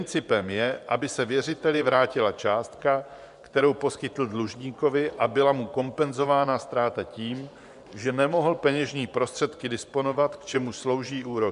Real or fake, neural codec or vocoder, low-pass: fake; vocoder, 24 kHz, 100 mel bands, Vocos; 10.8 kHz